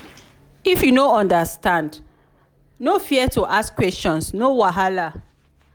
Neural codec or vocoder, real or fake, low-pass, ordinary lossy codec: none; real; none; none